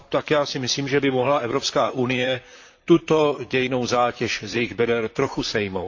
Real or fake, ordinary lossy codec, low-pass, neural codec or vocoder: fake; AAC, 48 kbps; 7.2 kHz; vocoder, 44.1 kHz, 128 mel bands, Pupu-Vocoder